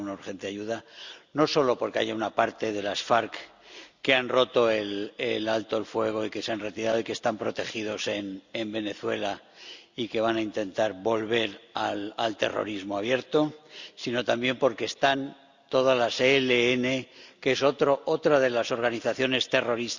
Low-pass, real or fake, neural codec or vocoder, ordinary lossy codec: 7.2 kHz; real; none; Opus, 64 kbps